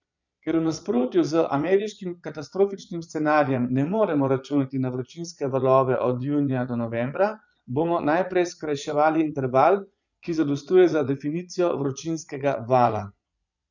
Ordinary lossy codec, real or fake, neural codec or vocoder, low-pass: none; fake; vocoder, 22.05 kHz, 80 mel bands, Vocos; 7.2 kHz